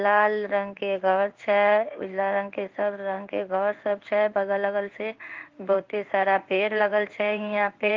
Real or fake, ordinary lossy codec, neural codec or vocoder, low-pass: fake; Opus, 32 kbps; codec, 16 kHz in and 24 kHz out, 1 kbps, XY-Tokenizer; 7.2 kHz